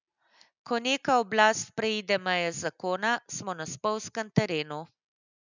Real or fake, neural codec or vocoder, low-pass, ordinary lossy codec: real; none; 7.2 kHz; none